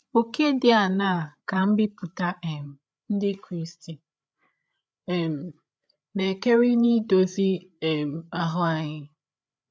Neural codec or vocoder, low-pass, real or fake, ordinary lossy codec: codec, 16 kHz, 8 kbps, FreqCodec, larger model; none; fake; none